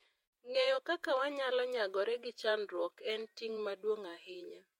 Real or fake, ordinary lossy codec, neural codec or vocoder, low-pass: fake; MP3, 64 kbps; vocoder, 48 kHz, 128 mel bands, Vocos; 19.8 kHz